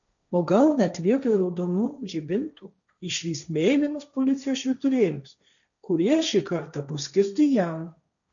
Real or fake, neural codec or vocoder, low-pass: fake; codec, 16 kHz, 1.1 kbps, Voila-Tokenizer; 7.2 kHz